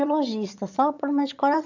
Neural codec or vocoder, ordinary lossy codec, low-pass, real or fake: vocoder, 22.05 kHz, 80 mel bands, HiFi-GAN; none; 7.2 kHz; fake